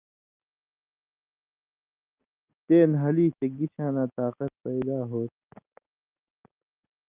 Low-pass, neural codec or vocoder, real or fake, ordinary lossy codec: 3.6 kHz; none; real; Opus, 32 kbps